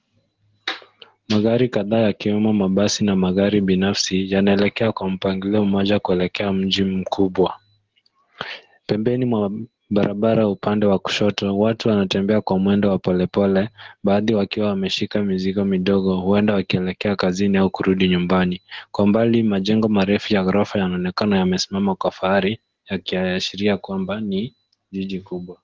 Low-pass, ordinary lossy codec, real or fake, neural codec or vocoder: 7.2 kHz; Opus, 16 kbps; real; none